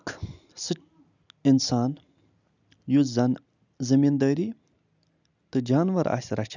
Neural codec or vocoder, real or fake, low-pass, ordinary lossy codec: none; real; 7.2 kHz; none